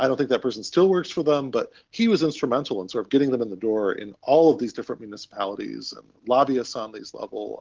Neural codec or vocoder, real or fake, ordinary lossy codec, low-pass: none; real; Opus, 16 kbps; 7.2 kHz